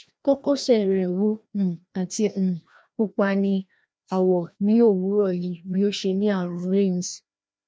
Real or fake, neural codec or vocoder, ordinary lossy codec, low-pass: fake; codec, 16 kHz, 1 kbps, FreqCodec, larger model; none; none